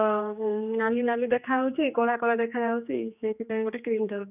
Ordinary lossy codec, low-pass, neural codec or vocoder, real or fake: none; 3.6 kHz; codec, 16 kHz, 2 kbps, X-Codec, HuBERT features, trained on general audio; fake